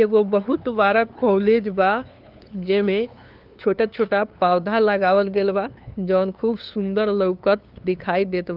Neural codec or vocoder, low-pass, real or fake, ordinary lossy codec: codec, 16 kHz, 2 kbps, FunCodec, trained on LibriTTS, 25 frames a second; 5.4 kHz; fake; Opus, 24 kbps